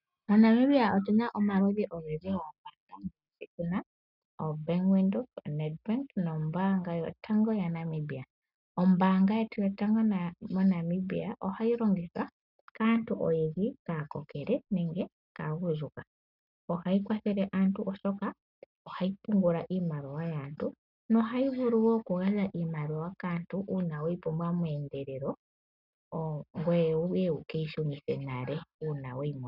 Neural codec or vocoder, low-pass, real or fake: none; 5.4 kHz; real